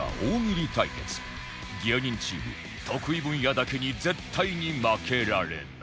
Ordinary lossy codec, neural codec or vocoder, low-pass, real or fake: none; none; none; real